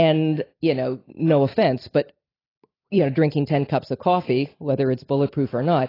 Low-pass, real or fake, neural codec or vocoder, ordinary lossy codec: 5.4 kHz; fake; vocoder, 44.1 kHz, 128 mel bands every 256 samples, BigVGAN v2; AAC, 24 kbps